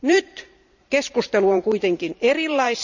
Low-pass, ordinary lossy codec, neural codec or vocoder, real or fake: 7.2 kHz; none; vocoder, 44.1 kHz, 128 mel bands every 512 samples, BigVGAN v2; fake